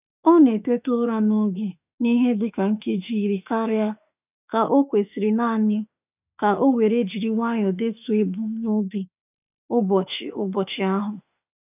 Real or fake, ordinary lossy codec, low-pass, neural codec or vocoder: fake; none; 3.6 kHz; autoencoder, 48 kHz, 32 numbers a frame, DAC-VAE, trained on Japanese speech